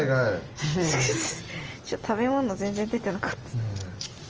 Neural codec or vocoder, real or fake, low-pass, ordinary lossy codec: none; real; 7.2 kHz; Opus, 24 kbps